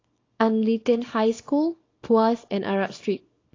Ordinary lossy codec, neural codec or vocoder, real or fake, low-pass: AAC, 32 kbps; codec, 24 kHz, 0.9 kbps, WavTokenizer, small release; fake; 7.2 kHz